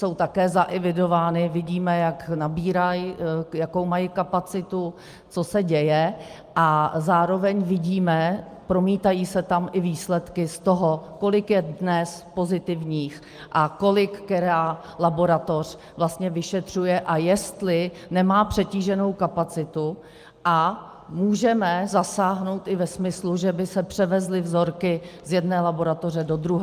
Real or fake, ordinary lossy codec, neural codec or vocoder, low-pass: real; Opus, 32 kbps; none; 14.4 kHz